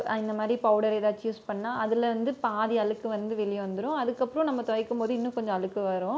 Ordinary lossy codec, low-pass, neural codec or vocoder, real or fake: none; none; none; real